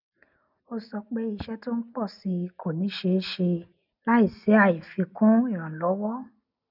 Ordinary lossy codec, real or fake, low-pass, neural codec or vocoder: none; real; 5.4 kHz; none